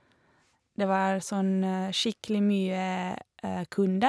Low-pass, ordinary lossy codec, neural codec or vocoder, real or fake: none; none; none; real